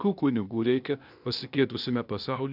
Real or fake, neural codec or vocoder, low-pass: fake; codec, 16 kHz, 0.8 kbps, ZipCodec; 5.4 kHz